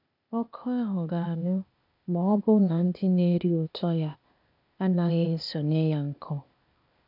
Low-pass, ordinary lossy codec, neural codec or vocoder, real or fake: 5.4 kHz; none; codec, 16 kHz, 0.8 kbps, ZipCodec; fake